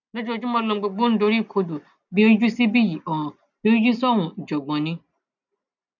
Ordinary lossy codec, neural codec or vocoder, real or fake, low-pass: none; none; real; 7.2 kHz